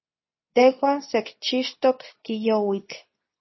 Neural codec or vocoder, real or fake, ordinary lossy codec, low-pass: codec, 24 kHz, 0.9 kbps, WavTokenizer, medium speech release version 2; fake; MP3, 24 kbps; 7.2 kHz